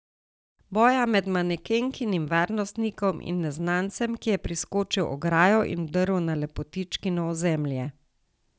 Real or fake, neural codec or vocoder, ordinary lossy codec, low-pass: real; none; none; none